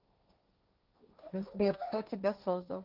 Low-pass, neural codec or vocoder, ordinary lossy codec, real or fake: 5.4 kHz; codec, 16 kHz, 1.1 kbps, Voila-Tokenizer; Opus, 24 kbps; fake